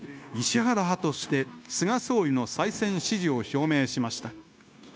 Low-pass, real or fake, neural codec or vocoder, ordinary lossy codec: none; fake; codec, 16 kHz, 0.9 kbps, LongCat-Audio-Codec; none